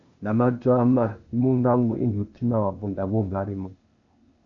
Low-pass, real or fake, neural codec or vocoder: 7.2 kHz; fake; codec, 16 kHz, 0.8 kbps, ZipCodec